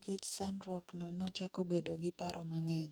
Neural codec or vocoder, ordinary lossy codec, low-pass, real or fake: codec, 44.1 kHz, 2.6 kbps, DAC; none; none; fake